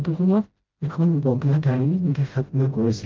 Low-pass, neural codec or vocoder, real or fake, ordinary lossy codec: 7.2 kHz; codec, 16 kHz, 0.5 kbps, FreqCodec, smaller model; fake; Opus, 24 kbps